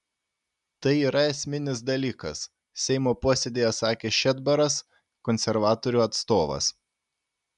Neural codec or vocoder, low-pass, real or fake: none; 10.8 kHz; real